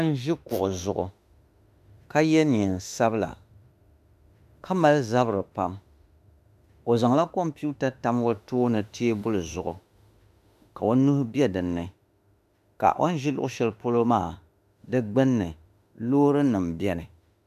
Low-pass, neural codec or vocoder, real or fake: 14.4 kHz; autoencoder, 48 kHz, 32 numbers a frame, DAC-VAE, trained on Japanese speech; fake